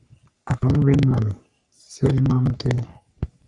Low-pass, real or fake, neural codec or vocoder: 10.8 kHz; fake; codec, 44.1 kHz, 3.4 kbps, Pupu-Codec